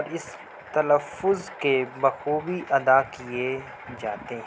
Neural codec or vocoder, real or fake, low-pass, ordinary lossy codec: none; real; none; none